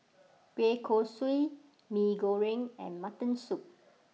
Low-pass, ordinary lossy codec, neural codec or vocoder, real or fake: none; none; none; real